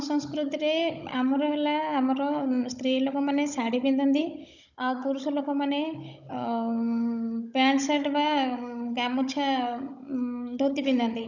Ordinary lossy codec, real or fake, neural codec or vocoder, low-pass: none; fake; codec, 16 kHz, 16 kbps, FunCodec, trained on Chinese and English, 50 frames a second; 7.2 kHz